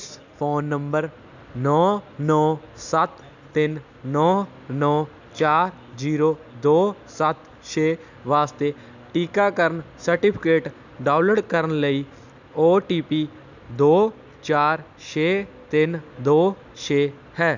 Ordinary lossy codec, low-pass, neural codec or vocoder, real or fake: none; 7.2 kHz; none; real